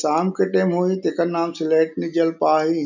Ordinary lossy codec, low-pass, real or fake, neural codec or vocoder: none; 7.2 kHz; real; none